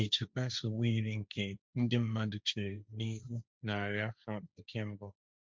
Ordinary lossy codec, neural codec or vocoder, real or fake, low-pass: none; codec, 16 kHz, 1.1 kbps, Voila-Tokenizer; fake; none